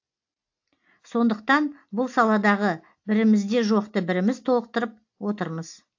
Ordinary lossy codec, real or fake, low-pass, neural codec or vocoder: AAC, 48 kbps; real; 7.2 kHz; none